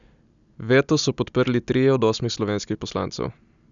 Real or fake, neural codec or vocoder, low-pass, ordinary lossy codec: real; none; 7.2 kHz; none